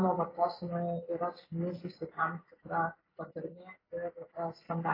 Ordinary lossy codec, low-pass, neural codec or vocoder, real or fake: AAC, 24 kbps; 5.4 kHz; none; real